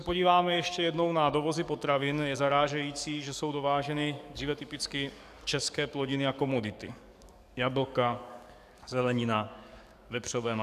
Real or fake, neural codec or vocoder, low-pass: fake; codec, 44.1 kHz, 7.8 kbps, DAC; 14.4 kHz